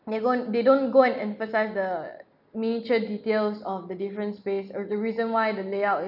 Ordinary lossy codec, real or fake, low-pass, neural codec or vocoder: none; real; 5.4 kHz; none